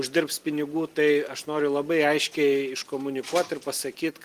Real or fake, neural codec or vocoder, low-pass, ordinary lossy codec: fake; vocoder, 44.1 kHz, 128 mel bands every 256 samples, BigVGAN v2; 14.4 kHz; Opus, 24 kbps